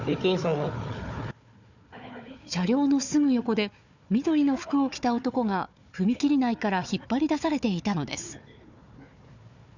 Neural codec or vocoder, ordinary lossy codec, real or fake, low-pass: codec, 16 kHz, 4 kbps, FunCodec, trained on Chinese and English, 50 frames a second; none; fake; 7.2 kHz